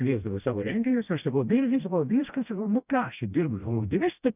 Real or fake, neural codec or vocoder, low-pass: fake; codec, 16 kHz, 1 kbps, FreqCodec, smaller model; 3.6 kHz